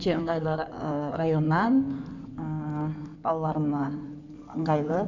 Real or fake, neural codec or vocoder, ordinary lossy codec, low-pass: fake; codec, 16 kHz in and 24 kHz out, 2.2 kbps, FireRedTTS-2 codec; none; 7.2 kHz